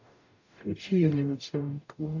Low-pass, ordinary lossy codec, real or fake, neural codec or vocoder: 7.2 kHz; none; fake; codec, 44.1 kHz, 0.9 kbps, DAC